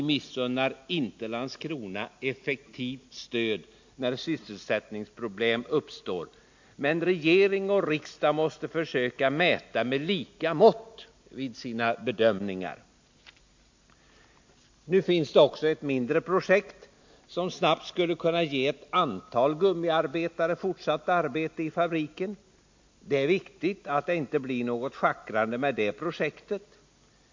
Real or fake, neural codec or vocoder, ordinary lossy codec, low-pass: real; none; MP3, 48 kbps; 7.2 kHz